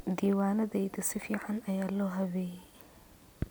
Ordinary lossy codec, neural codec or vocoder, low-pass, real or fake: none; none; none; real